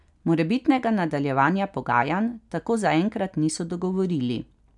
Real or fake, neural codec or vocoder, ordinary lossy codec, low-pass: real; none; none; 10.8 kHz